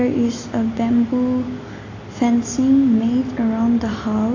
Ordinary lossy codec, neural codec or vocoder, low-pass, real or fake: AAC, 32 kbps; none; 7.2 kHz; real